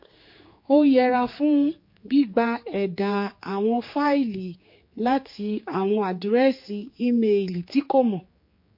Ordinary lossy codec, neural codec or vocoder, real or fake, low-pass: MP3, 32 kbps; codec, 16 kHz, 4 kbps, X-Codec, HuBERT features, trained on general audio; fake; 5.4 kHz